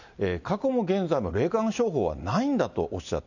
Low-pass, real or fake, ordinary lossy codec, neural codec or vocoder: 7.2 kHz; real; none; none